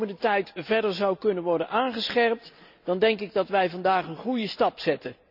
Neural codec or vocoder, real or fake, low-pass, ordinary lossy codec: none; real; 5.4 kHz; none